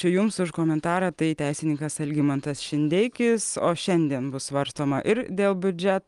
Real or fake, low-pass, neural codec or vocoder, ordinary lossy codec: real; 10.8 kHz; none; Opus, 32 kbps